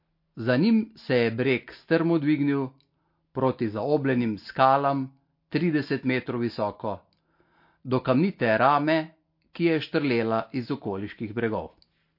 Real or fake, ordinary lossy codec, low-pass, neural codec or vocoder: real; MP3, 32 kbps; 5.4 kHz; none